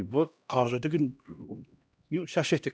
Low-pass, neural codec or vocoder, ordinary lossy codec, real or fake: none; codec, 16 kHz, 1 kbps, X-Codec, HuBERT features, trained on LibriSpeech; none; fake